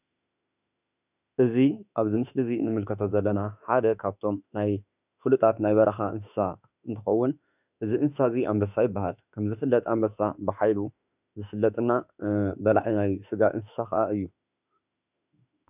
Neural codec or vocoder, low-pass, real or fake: autoencoder, 48 kHz, 32 numbers a frame, DAC-VAE, trained on Japanese speech; 3.6 kHz; fake